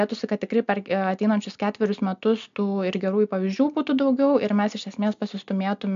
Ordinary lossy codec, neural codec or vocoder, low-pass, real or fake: MP3, 64 kbps; none; 7.2 kHz; real